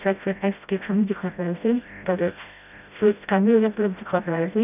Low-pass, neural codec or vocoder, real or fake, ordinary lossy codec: 3.6 kHz; codec, 16 kHz, 0.5 kbps, FreqCodec, smaller model; fake; none